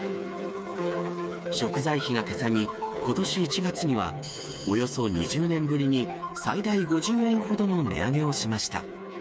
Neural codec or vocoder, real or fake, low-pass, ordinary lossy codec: codec, 16 kHz, 4 kbps, FreqCodec, smaller model; fake; none; none